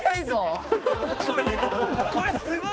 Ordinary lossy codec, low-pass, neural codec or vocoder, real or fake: none; none; codec, 16 kHz, 2 kbps, X-Codec, HuBERT features, trained on balanced general audio; fake